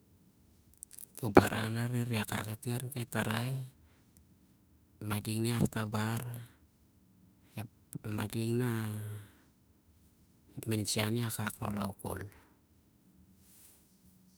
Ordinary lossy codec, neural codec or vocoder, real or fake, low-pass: none; autoencoder, 48 kHz, 32 numbers a frame, DAC-VAE, trained on Japanese speech; fake; none